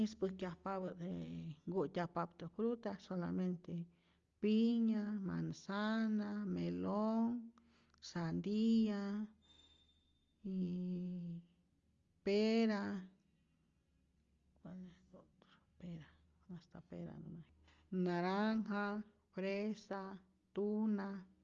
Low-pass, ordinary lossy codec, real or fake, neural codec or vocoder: 7.2 kHz; Opus, 32 kbps; real; none